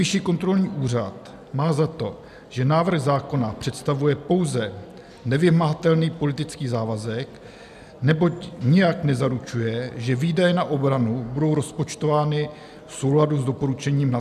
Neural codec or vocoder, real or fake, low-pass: none; real; 14.4 kHz